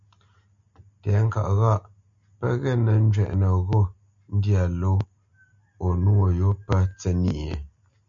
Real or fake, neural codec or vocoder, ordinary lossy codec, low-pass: real; none; MP3, 64 kbps; 7.2 kHz